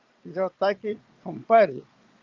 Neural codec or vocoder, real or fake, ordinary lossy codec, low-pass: vocoder, 22.05 kHz, 80 mel bands, Vocos; fake; Opus, 24 kbps; 7.2 kHz